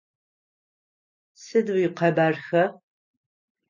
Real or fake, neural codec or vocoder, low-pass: real; none; 7.2 kHz